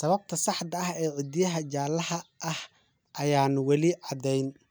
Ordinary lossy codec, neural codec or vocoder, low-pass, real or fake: none; none; none; real